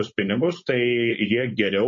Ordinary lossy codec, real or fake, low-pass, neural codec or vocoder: MP3, 32 kbps; fake; 7.2 kHz; codec, 16 kHz, 4.8 kbps, FACodec